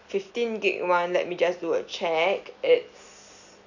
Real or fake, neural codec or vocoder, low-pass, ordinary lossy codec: real; none; 7.2 kHz; none